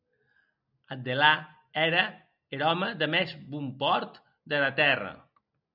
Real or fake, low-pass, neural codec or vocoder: real; 5.4 kHz; none